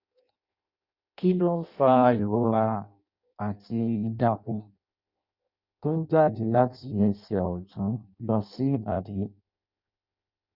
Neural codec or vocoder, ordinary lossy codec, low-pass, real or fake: codec, 16 kHz in and 24 kHz out, 0.6 kbps, FireRedTTS-2 codec; Opus, 64 kbps; 5.4 kHz; fake